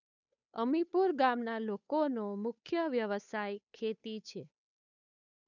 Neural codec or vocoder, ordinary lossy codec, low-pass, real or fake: codec, 16 kHz, 8 kbps, FunCodec, trained on Chinese and English, 25 frames a second; none; 7.2 kHz; fake